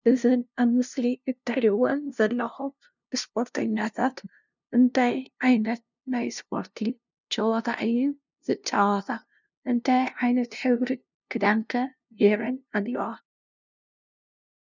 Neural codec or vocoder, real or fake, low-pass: codec, 16 kHz, 0.5 kbps, FunCodec, trained on LibriTTS, 25 frames a second; fake; 7.2 kHz